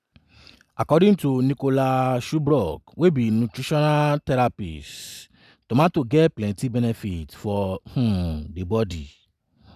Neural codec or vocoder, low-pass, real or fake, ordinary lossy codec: none; 14.4 kHz; real; none